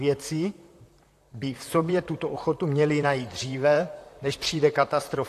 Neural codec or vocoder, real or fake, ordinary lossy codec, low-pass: vocoder, 44.1 kHz, 128 mel bands, Pupu-Vocoder; fake; AAC, 64 kbps; 14.4 kHz